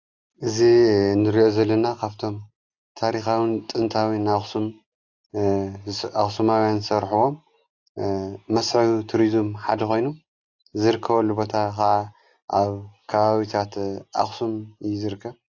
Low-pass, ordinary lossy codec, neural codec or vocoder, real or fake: 7.2 kHz; AAC, 48 kbps; none; real